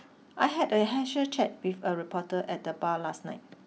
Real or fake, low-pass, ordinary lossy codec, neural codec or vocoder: real; none; none; none